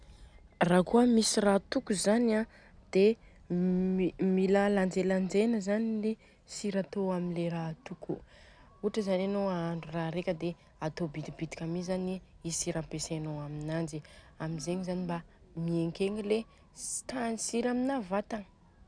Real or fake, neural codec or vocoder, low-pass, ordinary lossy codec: real; none; 9.9 kHz; none